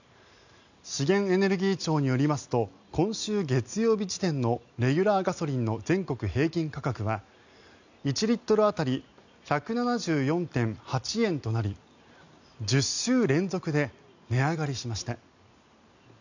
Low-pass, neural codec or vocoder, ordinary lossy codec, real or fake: 7.2 kHz; none; none; real